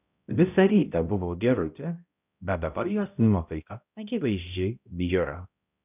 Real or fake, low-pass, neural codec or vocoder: fake; 3.6 kHz; codec, 16 kHz, 0.5 kbps, X-Codec, HuBERT features, trained on balanced general audio